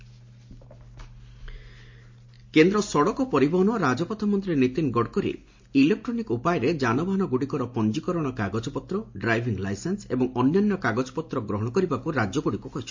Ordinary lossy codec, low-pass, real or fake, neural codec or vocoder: MP3, 48 kbps; 7.2 kHz; real; none